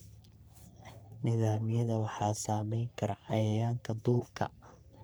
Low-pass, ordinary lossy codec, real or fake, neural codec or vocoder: none; none; fake; codec, 44.1 kHz, 3.4 kbps, Pupu-Codec